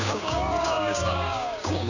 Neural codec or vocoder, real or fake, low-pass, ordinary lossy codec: codec, 16 kHz, 6 kbps, DAC; fake; 7.2 kHz; none